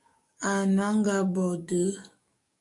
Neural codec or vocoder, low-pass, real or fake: codec, 44.1 kHz, 7.8 kbps, DAC; 10.8 kHz; fake